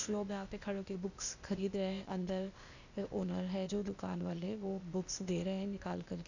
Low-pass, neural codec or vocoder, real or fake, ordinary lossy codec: 7.2 kHz; codec, 16 kHz, 0.8 kbps, ZipCodec; fake; none